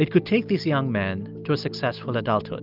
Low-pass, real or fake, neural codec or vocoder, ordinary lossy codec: 5.4 kHz; real; none; Opus, 32 kbps